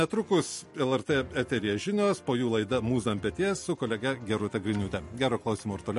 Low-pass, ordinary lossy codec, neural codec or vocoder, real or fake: 14.4 kHz; MP3, 48 kbps; none; real